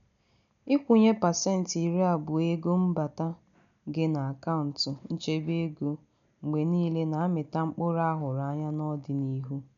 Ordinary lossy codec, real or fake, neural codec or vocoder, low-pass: none; real; none; 7.2 kHz